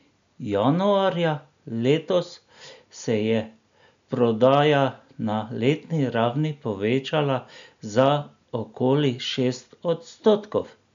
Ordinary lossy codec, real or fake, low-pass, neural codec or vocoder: MP3, 64 kbps; real; 7.2 kHz; none